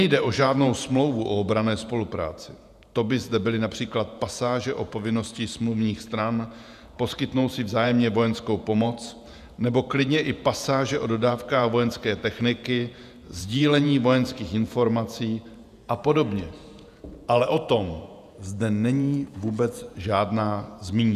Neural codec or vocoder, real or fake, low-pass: vocoder, 48 kHz, 128 mel bands, Vocos; fake; 14.4 kHz